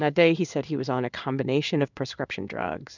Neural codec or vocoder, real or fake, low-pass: codec, 16 kHz in and 24 kHz out, 1 kbps, XY-Tokenizer; fake; 7.2 kHz